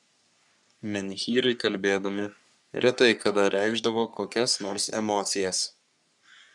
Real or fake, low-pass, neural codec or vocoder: fake; 10.8 kHz; codec, 44.1 kHz, 3.4 kbps, Pupu-Codec